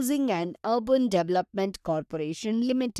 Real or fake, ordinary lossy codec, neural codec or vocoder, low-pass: fake; none; codec, 44.1 kHz, 3.4 kbps, Pupu-Codec; 14.4 kHz